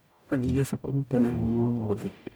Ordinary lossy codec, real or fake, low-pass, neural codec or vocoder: none; fake; none; codec, 44.1 kHz, 0.9 kbps, DAC